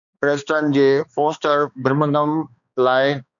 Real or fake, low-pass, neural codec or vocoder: fake; 7.2 kHz; codec, 16 kHz, 2 kbps, X-Codec, HuBERT features, trained on balanced general audio